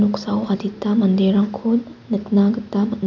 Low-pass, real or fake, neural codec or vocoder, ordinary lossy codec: 7.2 kHz; real; none; none